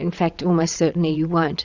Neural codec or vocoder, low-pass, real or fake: vocoder, 22.05 kHz, 80 mel bands, WaveNeXt; 7.2 kHz; fake